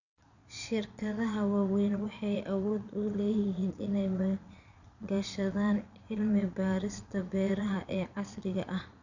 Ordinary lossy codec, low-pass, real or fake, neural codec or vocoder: none; 7.2 kHz; fake; vocoder, 22.05 kHz, 80 mel bands, Vocos